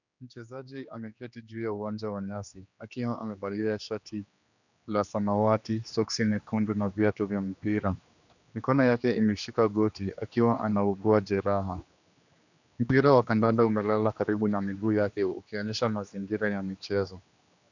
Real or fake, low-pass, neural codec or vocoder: fake; 7.2 kHz; codec, 16 kHz, 2 kbps, X-Codec, HuBERT features, trained on general audio